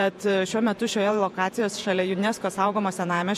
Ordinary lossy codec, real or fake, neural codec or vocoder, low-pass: MP3, 64 kbps; fake; vocoder, 48 kHz, 128 mel bands, Vocos; 14.4 kHz